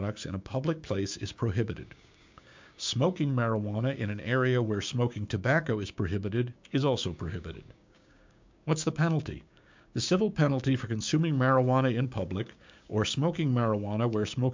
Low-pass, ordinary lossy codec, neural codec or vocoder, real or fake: 7.2 kHz; MP3, 64 kbps; codec, 16 kHz, 6 kbps, DAC; fake